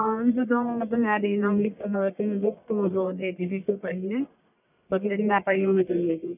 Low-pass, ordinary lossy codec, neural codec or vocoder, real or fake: 3.6 kHz; none; codec, 44.1 kHz, 1.7 kbps, Pupu-Codec; fake